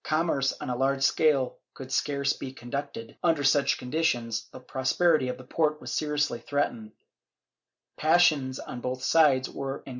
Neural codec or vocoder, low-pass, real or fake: none; 7.2 kHz; real